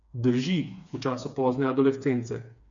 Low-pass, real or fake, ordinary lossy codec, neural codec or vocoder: 7.2 kHz; fake; none; codec, 16 kHz, 4 kbps, FreqCodec, smaller model